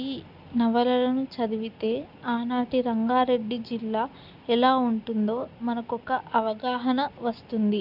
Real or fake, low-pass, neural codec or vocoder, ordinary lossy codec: real; 5.4 kHz; none; none